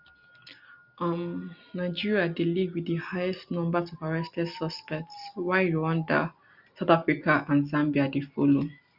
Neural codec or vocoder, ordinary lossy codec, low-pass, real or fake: none; none; 5.4 kHz; real